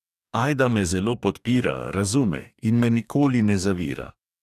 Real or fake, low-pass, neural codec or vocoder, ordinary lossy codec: fake; 14.4 kHz; codec, 44.1 kHz, 2.6 kbps, DAC; none